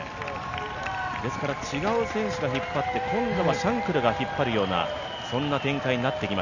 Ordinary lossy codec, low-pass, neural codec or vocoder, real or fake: none; 7.2 kHz; none; real